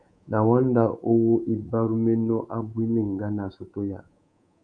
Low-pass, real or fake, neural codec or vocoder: 9.9 kHz; fake; codec, 24 kHz, 3.1 kbps, DualCodec